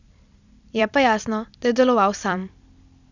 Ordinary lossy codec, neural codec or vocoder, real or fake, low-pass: none; vocoder, 44.1 kHz, 128 mel bands every 256 samples, BigVGAN v2; fake; 7.2 kHz